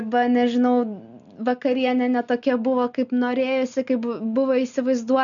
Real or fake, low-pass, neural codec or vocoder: real; 7.2 kHz; none